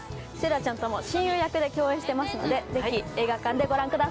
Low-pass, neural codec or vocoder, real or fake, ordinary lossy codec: none; none; real; none